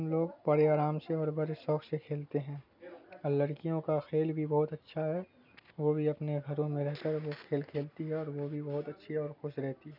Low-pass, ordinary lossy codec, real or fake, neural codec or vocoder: 5.4 kHz; none; real; none